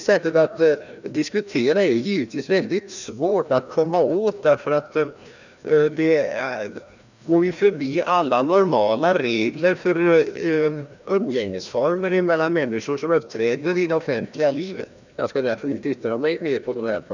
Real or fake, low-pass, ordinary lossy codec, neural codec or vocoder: fake; 7.2 kHz; none; codec, 16 kHz, 1 kbps, FreqCodec, larger model